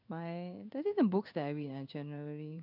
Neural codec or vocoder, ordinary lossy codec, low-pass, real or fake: codec, 16 kHz in and 24 kHz out, 1 kbps, XY-Tokenizer; none; 5.4 kHz; fake